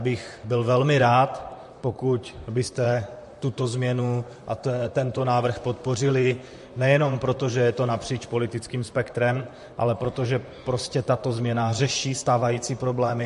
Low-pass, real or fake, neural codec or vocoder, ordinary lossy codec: 14.4 kHz; fake; vocoder, 44.1 kHz, 128 mel bands, Pupu-Vocoder; MP3, 48 kbps